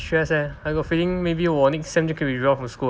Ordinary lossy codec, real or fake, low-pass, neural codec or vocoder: none; real; none; none